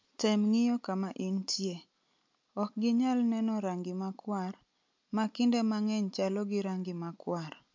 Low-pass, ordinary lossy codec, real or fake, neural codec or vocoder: 7.2 kHz; MP3, 48 kbps; real; none